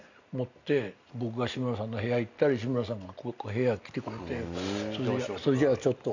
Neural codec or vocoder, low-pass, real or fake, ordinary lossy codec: none; 7.2 kHz; real; none